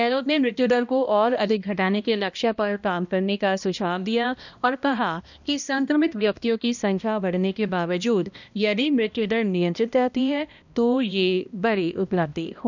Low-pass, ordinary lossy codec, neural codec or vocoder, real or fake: 7.2 kHz; none; codec, 16 kHz, 1 kbps, X-Codec, HuBERT features, trained on balanced general audio; fake